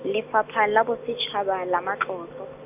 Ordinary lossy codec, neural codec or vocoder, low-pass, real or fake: none; none; 3.6 kHz; real